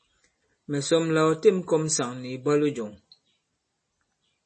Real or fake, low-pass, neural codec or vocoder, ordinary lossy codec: real; 10.8 kHz; none; MP3, 32 kbps